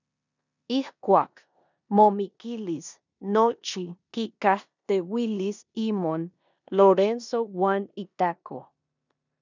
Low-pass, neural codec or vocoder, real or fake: 7.2 kHz; codec, 16 kHz in and 24 kHz out, 0.9 kbps, LongCat-Audio-Codec, four codebook decoder; fake